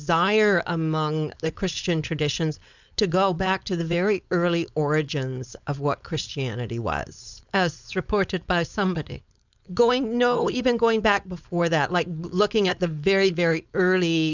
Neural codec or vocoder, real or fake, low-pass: codec, 16 kHz, 4.8 kbps, FACodec; fake; 7.2 kHz